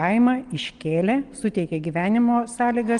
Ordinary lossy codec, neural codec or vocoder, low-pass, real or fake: Opus, 24 kbps; none; 9.9 kHz; real